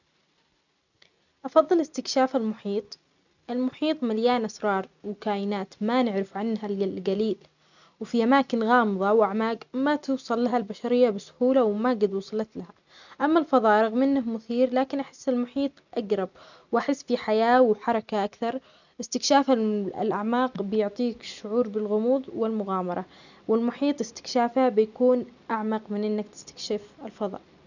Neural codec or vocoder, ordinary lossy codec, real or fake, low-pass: none; none; real; 7.2 kHz